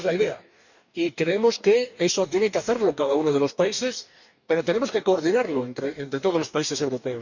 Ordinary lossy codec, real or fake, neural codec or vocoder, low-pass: none; fake; codec, 44.1 kHz, 2.6 kbps, DAC; 7.2 kHz